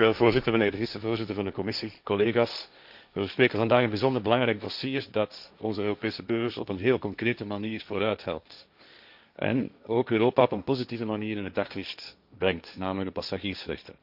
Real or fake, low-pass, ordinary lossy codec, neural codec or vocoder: fake; 5.4 kHz; none; codec, 16 kHz, 1.1 kbps, Voila-Tokenizer